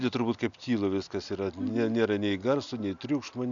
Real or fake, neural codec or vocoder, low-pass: real; none; 7.2 kHz